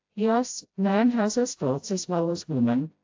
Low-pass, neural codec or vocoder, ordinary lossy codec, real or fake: 7.2 kHz; codec, 16 kHz, 0.5 kbps, FreqCodec, smaller model; AAC, 48 kbps; fake